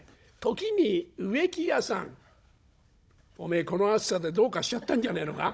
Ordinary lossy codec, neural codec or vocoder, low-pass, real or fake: none; codec, 16 kHz, 16 kbps, FunCodec, trained on Chinese and English, 50 frames a second; none; fake